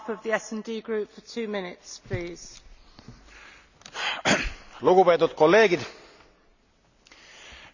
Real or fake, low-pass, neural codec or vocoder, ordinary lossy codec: real; 7.2 kHz; none; none